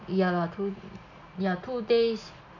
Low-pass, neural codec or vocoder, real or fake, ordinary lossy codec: 7.2 kHz; none; real; none